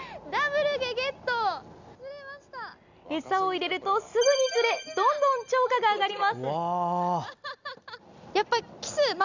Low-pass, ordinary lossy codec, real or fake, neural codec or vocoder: 7.2 kHz; Opus, 64 kbps; real; none